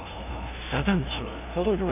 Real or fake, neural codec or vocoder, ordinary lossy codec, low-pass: fake; codec, 16 kHz, 0.5 kbps, FunCodec, trained on LibriTTS, 25 frames a second; none; 3.6 kHz